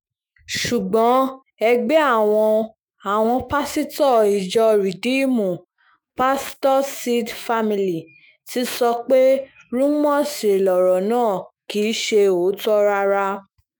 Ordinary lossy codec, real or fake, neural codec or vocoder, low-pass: none; fake; autoencoder, 48 kHz, 128 numbers a frame, DAC-VAE, trained on Japanese speech; none